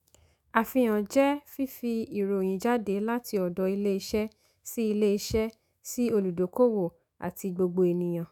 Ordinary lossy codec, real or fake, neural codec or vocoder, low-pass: none; fake; autoencoder, 48 kHz, 128 numbers a frame, DAC-VAE, trained on Japanese speech; none